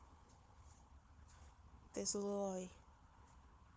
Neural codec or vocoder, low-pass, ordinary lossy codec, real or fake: codec, 16 kHz, 4 kbps, FunCodec, trained on Chinese and English, 50 frames a second; none; none; fake